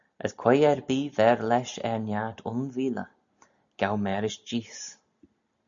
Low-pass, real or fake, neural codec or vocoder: 7.2 kHz; real; none